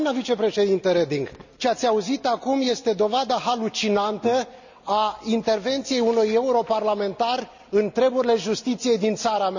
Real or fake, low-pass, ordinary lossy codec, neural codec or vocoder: real; 7.2 kHz; none; none